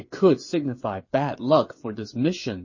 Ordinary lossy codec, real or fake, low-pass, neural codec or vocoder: MP3, 32 kbps; fake; 7.2 kHz; codec, 16 kHz, 8 kbps, FreqCodec, smaller model